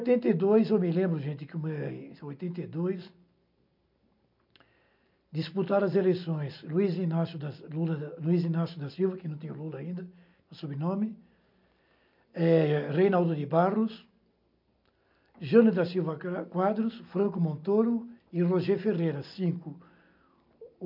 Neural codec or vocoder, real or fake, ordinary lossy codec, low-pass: none; real; MP3, 48 kbps; 5.4 kHz